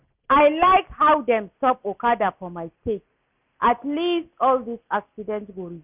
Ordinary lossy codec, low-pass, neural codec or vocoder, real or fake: none; 3.6 kHz; none; real